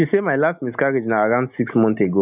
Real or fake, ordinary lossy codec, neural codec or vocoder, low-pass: real; none; none; 3.6 kHz